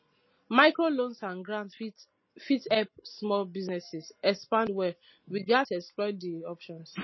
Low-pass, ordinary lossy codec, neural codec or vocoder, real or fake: 7.2 kHz; MP3, 24 kbps; none; real